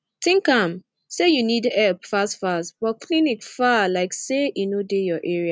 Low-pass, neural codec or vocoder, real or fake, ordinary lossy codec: none; none; real; none